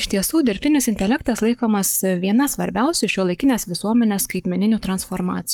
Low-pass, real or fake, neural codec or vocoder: 19.8 kHz; fake; codec, 44.1 kHz, 7.8 kbps, Pupu-Codec